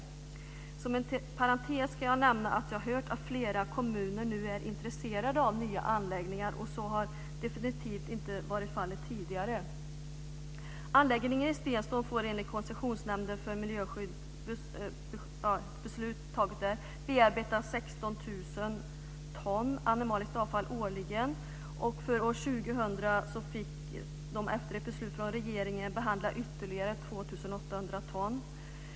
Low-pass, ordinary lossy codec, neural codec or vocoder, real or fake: none; none; none; real